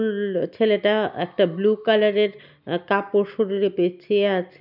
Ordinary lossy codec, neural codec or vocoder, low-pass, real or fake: none; none; 5.4 kHz; real